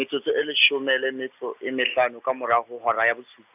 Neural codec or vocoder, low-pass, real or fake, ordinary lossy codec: none; 3.6 kHz; real; AAC, 32 kbps